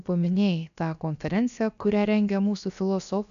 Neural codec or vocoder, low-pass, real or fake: codec, 16 kHz, about 1 kbps, DyCAST, with the encoder's durations; 7.2 kHz; fake